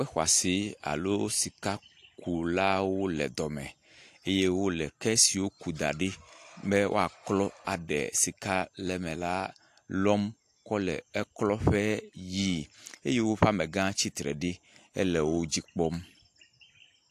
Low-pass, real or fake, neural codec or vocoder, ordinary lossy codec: 14.4 kHz; real; none; AAC, 64 kbps